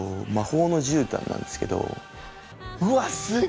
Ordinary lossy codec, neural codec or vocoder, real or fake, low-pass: none; none; real; none